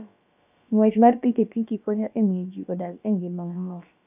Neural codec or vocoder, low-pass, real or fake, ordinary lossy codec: codec, 16 kHz, about 1 kbps, DyCAST, with the encoder's durations; 3.6 kHz; fake; none